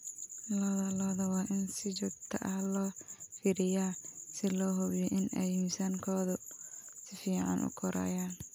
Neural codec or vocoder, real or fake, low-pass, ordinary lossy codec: none; real; none; none